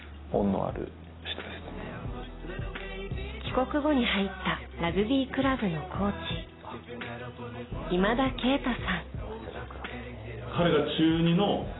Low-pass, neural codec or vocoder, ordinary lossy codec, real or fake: 7.2 kHz; none; AAC, 16 kbps; real